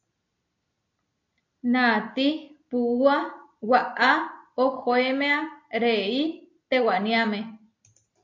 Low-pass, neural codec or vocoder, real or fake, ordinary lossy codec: 7.2 kHz; none; real; AAC, 48 kbps